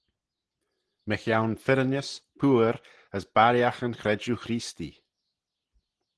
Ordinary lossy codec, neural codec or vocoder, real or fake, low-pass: Opus, 16 kbps; none; real; 10.8 kHz